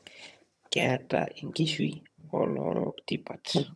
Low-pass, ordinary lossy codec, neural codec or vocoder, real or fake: none; none; vocoder, 22.05 kHz, 80 mel bands, HiFi-GAN; fake